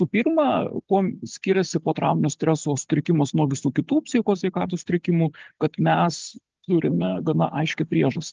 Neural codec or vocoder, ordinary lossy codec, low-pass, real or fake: none; Opus, 24 kbps; 7.2 kHz; real